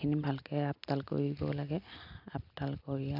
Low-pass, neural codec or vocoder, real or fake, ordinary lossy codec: 5.4 kHz; none; real; none